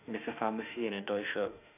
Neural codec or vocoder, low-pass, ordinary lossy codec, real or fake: autoencoder, 48 kHz, 32 numbers a frame, DAC-VAE, trained on Japanese speech; 3.6 kHz; none; fake